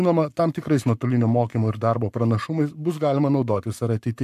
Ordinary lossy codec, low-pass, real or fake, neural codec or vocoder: MP3, 96 kbps; 14.4 kHz; fake; codec, 44.1 kHz, 7.8 kbps, Pupu-Codec